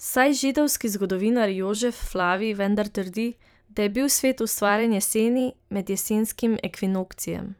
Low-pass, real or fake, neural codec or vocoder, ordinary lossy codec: none; fake; vocoder, 44.1 kHz, 128 mel bands every 512 samples, BigVGAN v2; none